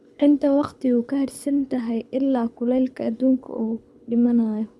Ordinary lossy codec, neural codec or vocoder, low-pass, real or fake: none; codec, 24 kHz, 6 kbps, HILCodec; none; fake